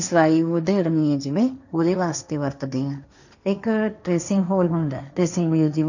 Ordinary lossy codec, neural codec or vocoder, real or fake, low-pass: none; codec, 16 kHz, 1.1 kbps, Voila-Tokenizer; fake; 7.2 kHz